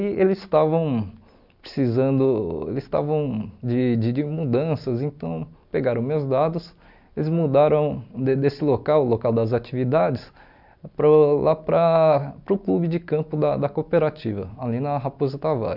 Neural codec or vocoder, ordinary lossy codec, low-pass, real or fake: none; none; 5.4 kHz; real